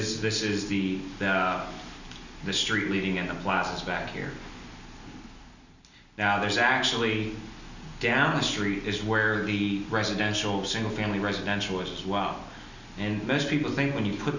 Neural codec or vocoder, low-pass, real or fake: none; 7.2 kHz; real